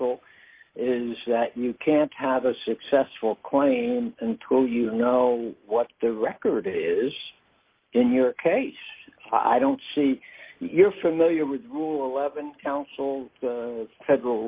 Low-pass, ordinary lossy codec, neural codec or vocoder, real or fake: 3.6 kHz; Opus, 32 kbps; none; real